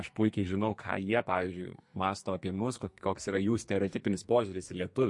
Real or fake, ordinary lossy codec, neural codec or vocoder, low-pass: fake; MP3, 48 kbps; codec, 44.1 kHz, 2.6 kbps, SNAC; 10.8 kHz